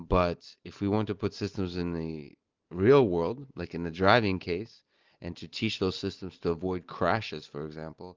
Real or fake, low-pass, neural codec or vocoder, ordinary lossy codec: real; 7.2 kHz; none; Opus, 32 kbps